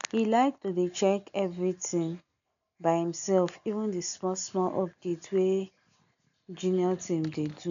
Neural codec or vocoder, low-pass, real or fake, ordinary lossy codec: none; 7.2 kHz; real; none